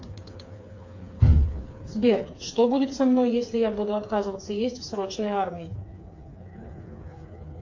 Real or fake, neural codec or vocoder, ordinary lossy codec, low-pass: fake; codec, 16 kHz, 4 kbps, FreqCodec, smaller model; AAC, 48 kbps; 7.2 kHz